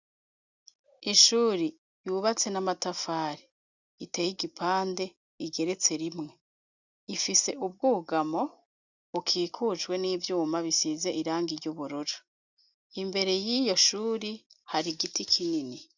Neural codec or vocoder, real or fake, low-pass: none; real; 7.2 kHz